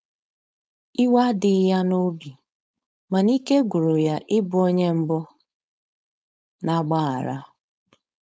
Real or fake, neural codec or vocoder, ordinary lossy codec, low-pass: fake; codec, 16 kHz, 4.8 kbps, FACodec; none; none